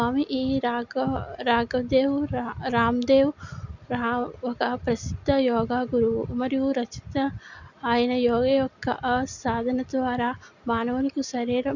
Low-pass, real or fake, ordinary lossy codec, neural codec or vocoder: 7.2 kHz; real; none; none